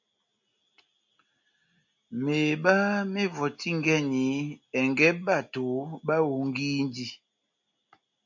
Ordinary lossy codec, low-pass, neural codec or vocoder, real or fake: MP3, 48 kbps; 7.2 kHz; none; real